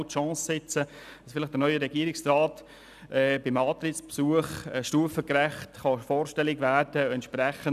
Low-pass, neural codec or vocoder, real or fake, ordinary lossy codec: 14.4 kHz; none; real; none